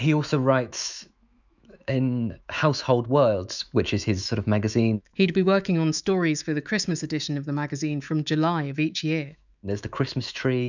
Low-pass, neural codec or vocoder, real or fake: 7.2 kHz; autoencoder, 48 kHz, 128 numbers a frame, DAC-VAE, trained on Japanese speech; fake